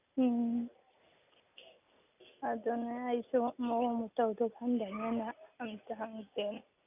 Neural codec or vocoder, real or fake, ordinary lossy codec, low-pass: none; real; none; 3.6 kHz